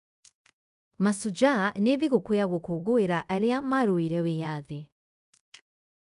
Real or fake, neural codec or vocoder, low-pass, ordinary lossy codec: fake; codec, 24 kHz, 0.5 kbps, DualCodec; 10.8 kHz; none